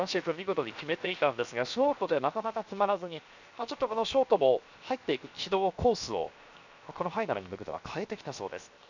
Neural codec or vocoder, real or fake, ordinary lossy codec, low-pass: codec, 16 kHz, 0.7 kbps, FocalCodec; fake; none; 7.2 kHz